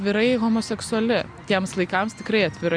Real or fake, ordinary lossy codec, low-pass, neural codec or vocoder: real; Opus, 64 kbps; 9.9 kHz; none